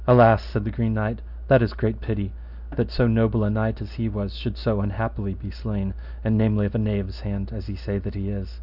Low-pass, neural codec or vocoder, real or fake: 5.4 kHz; none; real